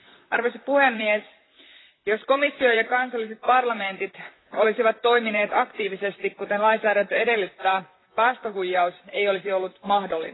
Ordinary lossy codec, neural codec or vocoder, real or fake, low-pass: AAC, 16 kbps; vocoder, 44.1 kHz, 128 mel bands, Pupu-Vocoder; fake; 7.2 kHz